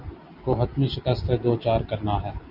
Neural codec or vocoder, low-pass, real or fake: none; 5.4 kHz; real